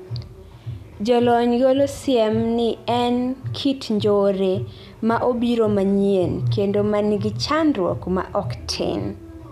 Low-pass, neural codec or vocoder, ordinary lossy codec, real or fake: 14.4 kHz; none; none; real